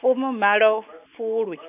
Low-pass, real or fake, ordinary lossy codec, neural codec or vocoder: 3.6 kHz; real; none; none